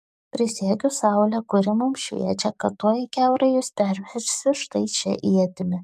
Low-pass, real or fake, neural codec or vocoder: 14.4 kHz; fake; codec, 44.1 kHz, 7.8 kbps, DAC